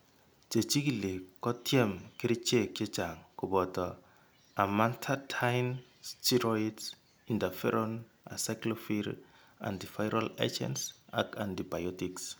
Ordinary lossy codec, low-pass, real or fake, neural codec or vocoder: none; none; real; none